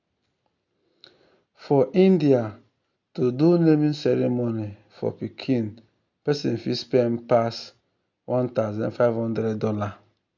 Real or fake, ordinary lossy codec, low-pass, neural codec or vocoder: real; none; 7.2 kHz; none